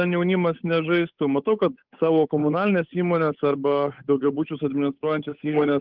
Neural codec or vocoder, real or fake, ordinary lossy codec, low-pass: codec, 16 kHz, 8 kbps, FunCodec, trained on Chinese and English, 25 frames a second; fake; Opus, 24 kbps; 5.4 kHz